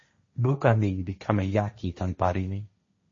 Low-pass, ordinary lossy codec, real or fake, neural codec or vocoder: 7.2 kHz; MP3, 32 kbps; fake; codec, 16 kHz, 1.1 kbps, Voila-Tokenizer